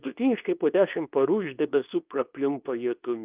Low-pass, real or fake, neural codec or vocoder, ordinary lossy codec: 3.6 kHz; fake; codec, 24 kHz, 0.9 kbps, WavTokenizer, medium speech release version 2; Opus, 24 kbps